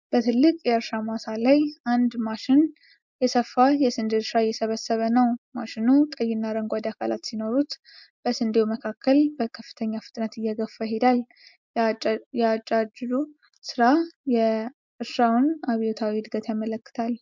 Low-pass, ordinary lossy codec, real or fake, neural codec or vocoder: 7.2 kHz; MP3, 64 kbps; real; none